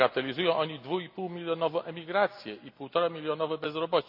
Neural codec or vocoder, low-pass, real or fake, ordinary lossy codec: none; 5.4 kHz; real; AAC, 48 kbps